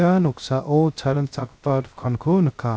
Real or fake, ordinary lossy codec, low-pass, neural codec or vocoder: fake; none; none; codec, 16 kHz, 0.3 kbps, FocalCodec